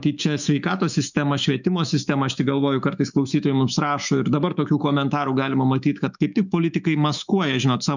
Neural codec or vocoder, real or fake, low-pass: autoencoder, 48 kHz, 128 numbers a frame, DAC-VAE, trained on Japanese speech; fake; 7.2 kHz